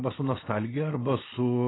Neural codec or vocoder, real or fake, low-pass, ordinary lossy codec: none; real; 7.2 kHz; AAC, 16 kbps